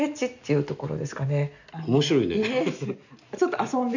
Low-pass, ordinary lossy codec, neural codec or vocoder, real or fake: 7.2 kHz; none; none; real